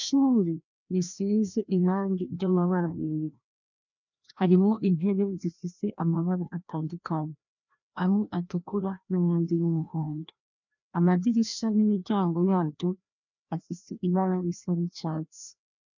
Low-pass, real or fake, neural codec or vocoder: 7.2 kHz; fake; codec, 16 kHz, 1 kbps, FreqCodec, larger model